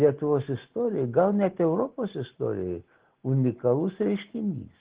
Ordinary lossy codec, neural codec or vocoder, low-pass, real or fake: Opus, 16 kbps; none; 3.6 kHz; real